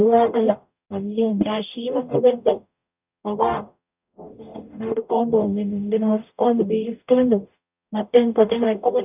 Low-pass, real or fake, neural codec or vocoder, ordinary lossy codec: 3.6 kHz; fake; codec, 44.1 kHz, 0.9 kbps, DAC; none